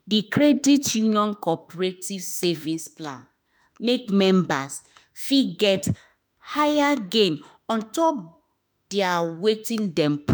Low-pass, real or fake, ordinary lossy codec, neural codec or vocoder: none; fake; none; autoencoder, 48 kHz, 32 numbers a frame, DAC-VAE, trained on Japanese speech